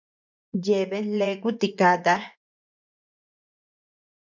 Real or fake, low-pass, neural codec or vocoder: fake; 7.2 kHz; vocoder, 24 kHz, 100 mel bands, Vocos